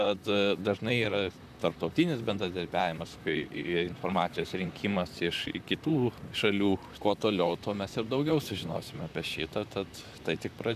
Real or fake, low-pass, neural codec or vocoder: fake; 14.4 kHz; vocoder, 44.1 kHz, 128 mel bands, Pupu-Vocoder